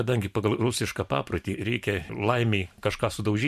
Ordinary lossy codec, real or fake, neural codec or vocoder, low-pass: MP3, 96 kbps; fake; vocoder, 44.1 kHz, 128 mel bands every 512 samples, BigVGAN v2; 14.4 kHz